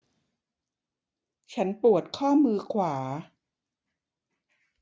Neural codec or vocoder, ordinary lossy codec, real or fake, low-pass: none; none; real; none